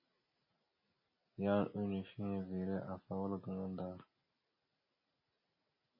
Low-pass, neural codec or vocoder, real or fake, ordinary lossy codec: 5.4 kHz; none; real; MP3, 24 kbps